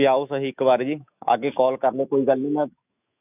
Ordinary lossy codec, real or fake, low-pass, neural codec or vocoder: none; fake; 3.6 kHz; autoencoder, 48 kHz, 128 numbers a frame, DAC-VAE, trained on Japanese speech